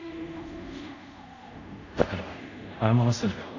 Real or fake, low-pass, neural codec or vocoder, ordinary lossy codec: fake; 7.2 kHz; codec, 24 kHz, 0.5 kbps, DualCodec; none